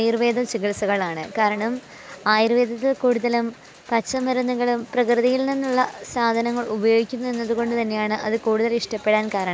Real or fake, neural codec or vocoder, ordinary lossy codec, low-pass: real; none; none; none